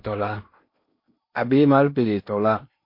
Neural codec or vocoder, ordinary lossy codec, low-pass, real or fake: codec, 16 kHz in and 24 kHz out, 0.8 kbps, FocalCodec, streaming, 65536 codes; MP3, 32 kbps; 5.4 kHz; fake